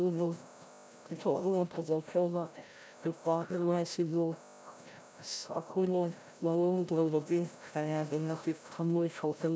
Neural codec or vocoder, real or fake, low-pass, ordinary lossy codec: codec, 16 kHz, 0.5 kbps, FreqCodec, larger model; fake; none; none